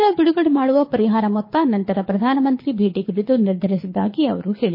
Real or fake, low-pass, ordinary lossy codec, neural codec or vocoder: fake; 5.4 kHz; MP3, 24 kbps; codec, 24 kHz, 6 kbps, HILCodec